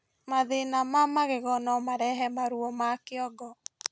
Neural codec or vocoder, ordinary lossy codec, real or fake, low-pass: none; none; real; none